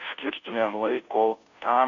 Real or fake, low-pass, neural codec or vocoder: fake; 7.2 kHz; codec, 16 kHz, 0.5 kbps, FunCodec, trained on Chinese and English, 25 frames a second